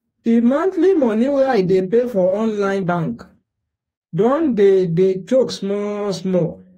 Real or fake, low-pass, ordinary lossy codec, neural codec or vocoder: fake; 19.8 kHz; AAC, 48 kbps; codec, 44.1 kHz, 2.6 kbps, DAC